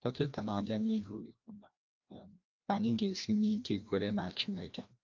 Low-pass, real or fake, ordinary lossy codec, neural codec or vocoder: 7.2 kHz; fake; Opus, 24 kbps; codec, 16 kHz, 1 kbps, FreqCodec, larger model